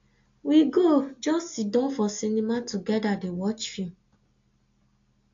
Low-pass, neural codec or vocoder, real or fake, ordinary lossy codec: 7.2 kHz; none; real; AAC, 48 kbps